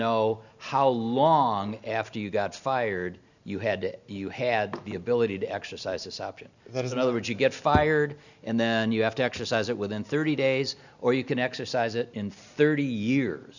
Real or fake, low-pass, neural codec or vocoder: real; 7.2 kHz; none